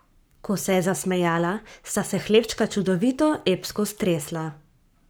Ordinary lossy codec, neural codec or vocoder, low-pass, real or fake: none; codec, 44.1 kHz, 7.8 kbps, Pupu-Codec; none; fake